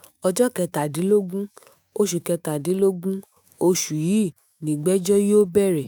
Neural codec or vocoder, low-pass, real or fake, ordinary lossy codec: autoencoder, 48 kHz, 128 numbers a frame, DAC-VAE, trained on Japanese speech; none; fake; none